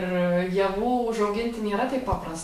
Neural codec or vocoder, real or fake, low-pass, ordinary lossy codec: autoencoder, 48 kHz, 128 numbers a frame, DAC-VAE, trained on Japanese speech; fake; 14.4 kHz; MP3, 64 kbps